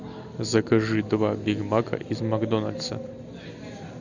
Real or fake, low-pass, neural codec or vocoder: real; 7.2 kHz; none